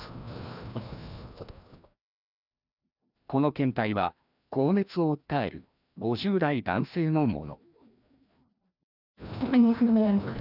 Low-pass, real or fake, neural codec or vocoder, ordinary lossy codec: 5.4 kHz; fake; codec, 16 kHz, 1 kbps, FreqCodec, larger model; none